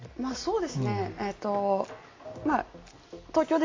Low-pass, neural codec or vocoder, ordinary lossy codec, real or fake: 7.2 kHz; none; AAC, 32 kbps; real